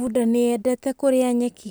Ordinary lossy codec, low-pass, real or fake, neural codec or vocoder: none; none; real; none